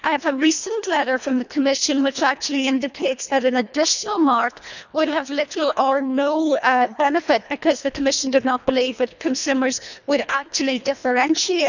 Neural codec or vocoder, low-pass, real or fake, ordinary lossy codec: codec, 24 kHz, 1.5 kbps, HILCodec; 7.2 kHz; fake; none